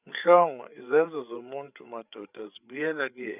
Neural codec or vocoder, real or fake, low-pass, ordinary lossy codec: codec, 16 kHz, 8 kbps, FreqCodec, larger model; fake; 3.6 kHz; none